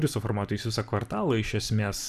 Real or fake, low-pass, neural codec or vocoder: fake; 14.4 kHz; vocoder, 44.1 kHz, 128 mel bands every 256 samples, BigVGAN v2